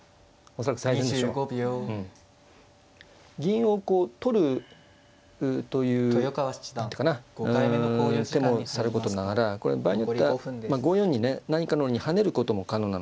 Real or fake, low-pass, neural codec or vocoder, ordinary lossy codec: real; none; none; none